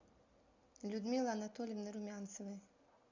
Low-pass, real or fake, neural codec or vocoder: 7.2 kHz; real; none